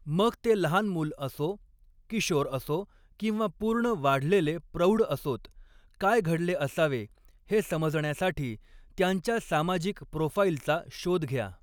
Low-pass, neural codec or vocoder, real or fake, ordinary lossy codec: 19.8 kHz; none; real; none